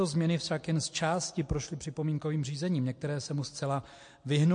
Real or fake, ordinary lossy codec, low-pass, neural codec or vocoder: real; MP3, 48 kbps; 9.9 kHz; none